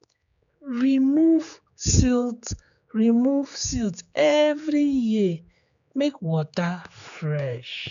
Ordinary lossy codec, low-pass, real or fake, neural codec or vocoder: none; 7.2 kHz; fake; codec, 16 kHz, 4 kbps, X-Codec, HuBERT features, trained on general audio